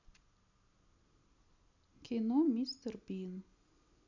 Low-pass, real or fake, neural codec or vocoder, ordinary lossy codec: 7.2 kHz; real; none; none